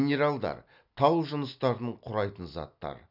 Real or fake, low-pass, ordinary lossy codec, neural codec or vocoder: real; 5.4 kHz; none; none